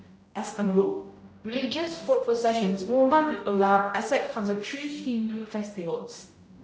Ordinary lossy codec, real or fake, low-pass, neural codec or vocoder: none; fake; none; codec, 16 kHz, 0.5 kbps, X-Codec, HuBERT features, trained on general audio